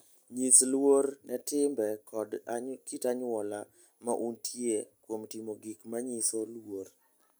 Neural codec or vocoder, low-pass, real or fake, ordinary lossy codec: none; none; real; none